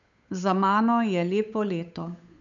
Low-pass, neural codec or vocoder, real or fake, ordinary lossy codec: 7.2 kHz; codec, 16 kHz, 8 kbps, FunCodec, trained on Chinese and English, 25 frames a second; fake; none